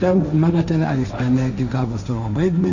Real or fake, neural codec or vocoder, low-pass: fake; codec, 16 kHz, 1.1 kbps, Voila-Tokenizer; 7.2 kHz